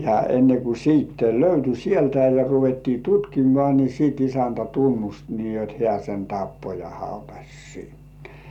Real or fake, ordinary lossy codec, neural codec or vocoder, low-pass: real; none; none; 19.8 kHz